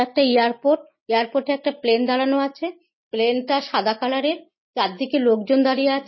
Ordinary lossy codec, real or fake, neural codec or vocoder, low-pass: MP3, 24 kbps; real; none; 7.2 kHz